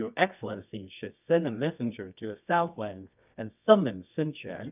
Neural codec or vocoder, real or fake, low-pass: codec, 24 kHz, 0.9 kbps, WavTokenizer, medium music audio release; fake; 3.6 kHz